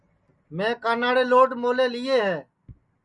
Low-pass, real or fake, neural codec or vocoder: 10.8 kHz; real; none